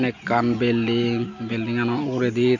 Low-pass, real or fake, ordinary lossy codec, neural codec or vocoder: 7.2 kHz; real; none; none